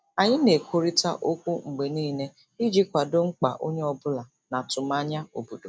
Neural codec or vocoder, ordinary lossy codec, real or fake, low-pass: none; none; real; none